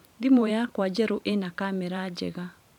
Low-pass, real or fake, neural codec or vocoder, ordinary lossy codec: 19.8 kHz; fake; vocoder, 44.1 kHz, 128 mel bands every 512 samples, BigVGAN v2; none